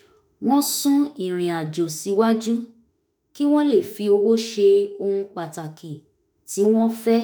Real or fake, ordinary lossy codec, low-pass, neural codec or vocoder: fake; none; none; autoencoder, 48 kHz, 32 numbers a frame, DAC-VAE, trained on Japanese speech